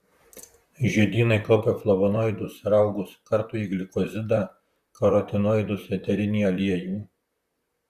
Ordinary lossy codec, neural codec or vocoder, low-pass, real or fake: Opus, 64 kbps; vocoder, 44.1 kHz, 128 mel bands, Pupu-Vocoder; 14.4 kHz; fake